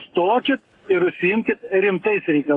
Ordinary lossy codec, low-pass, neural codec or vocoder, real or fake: AAC, 64 kbps; 10.8 kHz; codec, 44.1 kHz, 7.8 kbps, Pupu-Codec; fake